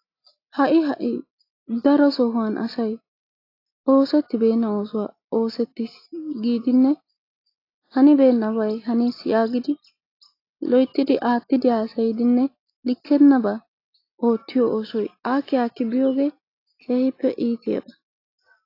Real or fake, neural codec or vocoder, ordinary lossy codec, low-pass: real; none; AAC, 32 kbps; 5.4 kHz